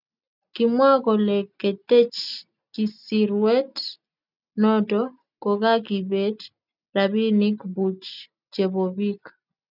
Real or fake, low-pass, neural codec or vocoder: real; 5.4 kHz; none